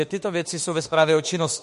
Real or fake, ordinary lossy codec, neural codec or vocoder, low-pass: fake; MP3, 48 kbps; autoencoder, 48 kHz, 32 numbers a frame, DAC-VAE, trained on Japanese speech; 14.4 kHz